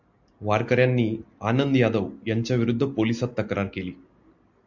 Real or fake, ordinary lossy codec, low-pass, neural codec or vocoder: real; MP3, 64 kbps; 7.2 kHz; none